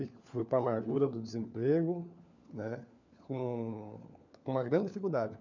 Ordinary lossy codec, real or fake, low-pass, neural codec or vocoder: none; fake; 7.2 kHz; codec, 16 kHz, 4 kbps, FunCodec, trained on LibriTTS, 50 frames a second